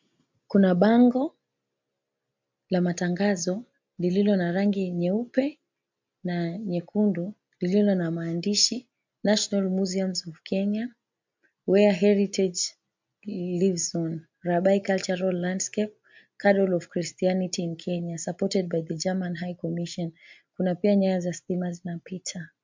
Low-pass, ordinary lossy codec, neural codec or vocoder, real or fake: 7.2 kHz; MP3, 64 kbps; none; real